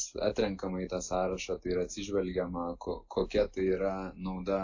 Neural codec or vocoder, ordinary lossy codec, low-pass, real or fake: none; AAC, 48 kbps; 7.2 kHz; real